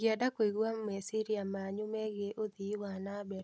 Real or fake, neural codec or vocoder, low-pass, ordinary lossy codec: real; none; none; none